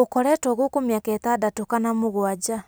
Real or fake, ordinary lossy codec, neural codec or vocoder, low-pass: real; none; none; none